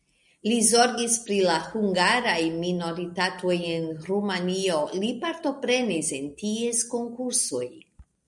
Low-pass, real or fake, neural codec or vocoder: 10.8 kHz; real; none